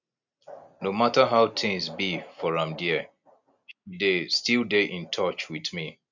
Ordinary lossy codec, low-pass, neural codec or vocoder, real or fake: none; 7.2 kHz; none; real